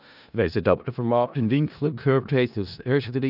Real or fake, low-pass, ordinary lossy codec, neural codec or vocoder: fake; 5.4 kHz; none; codec, 16 kHz in and 24 kHz out, 0.4 kbps, LongCat-Audio-Codec, four codebook decoder